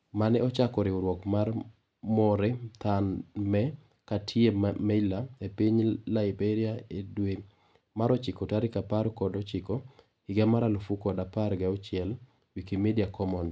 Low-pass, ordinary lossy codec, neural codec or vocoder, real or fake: none; none; none; real